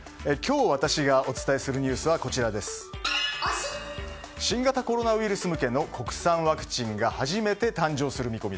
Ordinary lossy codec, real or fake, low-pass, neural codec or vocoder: none; real; none; none